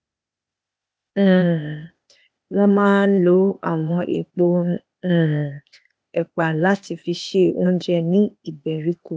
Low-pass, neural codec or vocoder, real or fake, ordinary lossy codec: none; codec, 16 kHz, 0.8 kbps, ZipCodec; fake; none